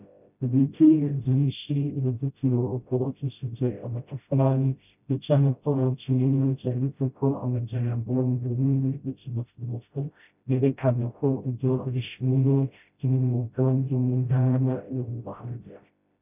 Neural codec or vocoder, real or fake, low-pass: codec, 16 kHz, 0.5 kbps, FreqCodec, smaller model; fake; 3.6 kHz